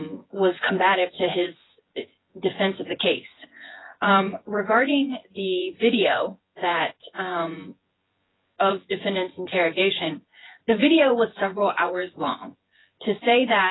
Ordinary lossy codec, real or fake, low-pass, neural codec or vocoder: AAC, 16 kbps; fake; 7.2 kHz; vocoder, 24 kHz, 100 mel bands, Vocos